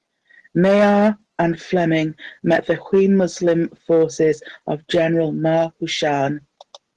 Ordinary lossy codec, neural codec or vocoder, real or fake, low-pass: Opus, 16 kbps; none; real; 10.8 kHz